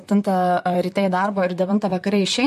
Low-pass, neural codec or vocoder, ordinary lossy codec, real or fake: 14.4 kHz; vocoder, 44.1 kHz, 128 mel bands, Pupu-Vocoder; MP3, 64 kbps; fake